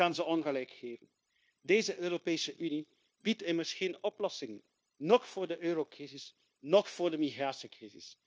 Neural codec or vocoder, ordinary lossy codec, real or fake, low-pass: codec, 16 kHz, 0.9 kbps, LongCat-Audio-Codec; none; fake; none